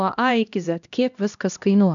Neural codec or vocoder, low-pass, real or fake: codec, 16 kHz, 1 kbps, X-Codec, HuBERT features, trained on LibriSpeech; 7.2 kHz; fake